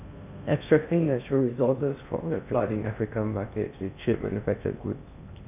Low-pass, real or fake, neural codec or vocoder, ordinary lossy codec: 3.6 kHz; fake; codec, 16 kHz in and 24 kHz out, 0.6 kbps, FocalCodec, streaming, 4096 codes; none